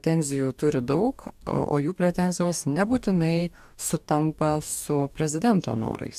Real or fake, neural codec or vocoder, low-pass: fake; codec, 44.1 kHz, 2.6 kbps, DAC; 14.4 kHz